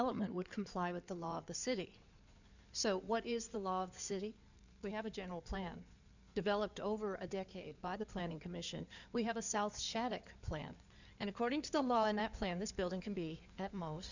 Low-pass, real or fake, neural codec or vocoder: 7.2 kHz; fake; codec, 16 kHz in and 24 kHz out, 2.2 kbps, FireRedTTS-2 codec